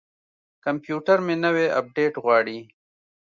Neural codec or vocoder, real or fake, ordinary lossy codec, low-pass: none; real; Opus, 64 kbps; 7.2 kHz